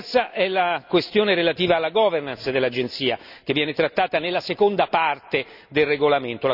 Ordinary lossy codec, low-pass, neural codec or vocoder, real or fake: none; 5.4 kHz; none; real